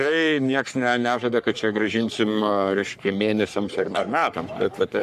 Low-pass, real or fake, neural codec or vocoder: 14.4 kHz; fake; codec, 44.1 kHz, 3.4 kbps, Pupu-Codec